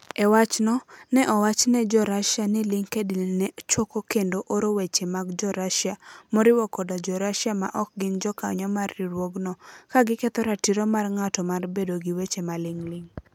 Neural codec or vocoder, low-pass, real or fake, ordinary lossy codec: none; 19.8 kHz; real; MP3, 96 kbps